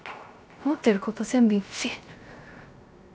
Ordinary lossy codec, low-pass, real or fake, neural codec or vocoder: none; none; fake; codec, 16 kHz, 0.3 kbps, FocalCodec